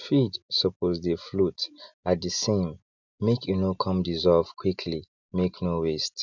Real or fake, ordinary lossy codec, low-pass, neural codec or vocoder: real; none; 7.2 kHz; none